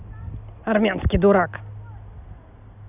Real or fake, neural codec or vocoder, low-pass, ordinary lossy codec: real; none; 3.6 kHz; none